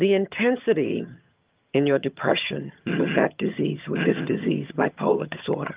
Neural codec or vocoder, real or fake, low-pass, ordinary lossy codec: vocoder, 22.05 kHz, 80 mel bands, HiFi-GAN; fake; 3.6 kHz; Opus, 32 kbps